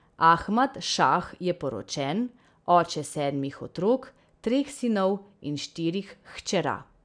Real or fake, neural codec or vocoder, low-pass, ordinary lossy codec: real; none; 9.9 kHz; none